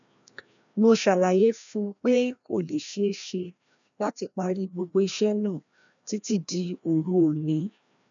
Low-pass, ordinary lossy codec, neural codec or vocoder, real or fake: 7.2 kHz; none; codec, 16 kHz, 1 kbps, FreqCodec, larger model; fake